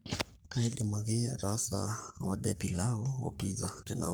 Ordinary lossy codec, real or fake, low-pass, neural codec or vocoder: none; fake; none; codec, 44.1 kHz, 3.4 kbps, Pupu-Codec